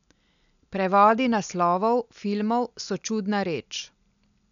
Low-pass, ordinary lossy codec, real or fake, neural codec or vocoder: 7.2 kHz; none; real; none